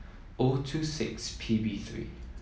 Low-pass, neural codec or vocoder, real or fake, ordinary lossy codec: none; none; real; none